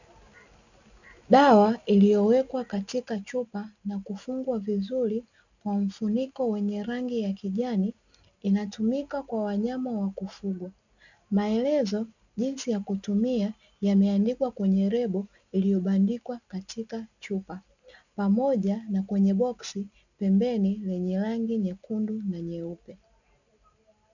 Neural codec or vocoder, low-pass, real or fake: none; 7.2 kHz; real